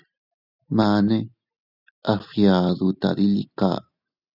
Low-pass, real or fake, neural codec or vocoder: 5.4 kHz; real; none